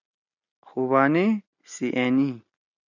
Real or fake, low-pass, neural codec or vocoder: real; 7.2 kHz; none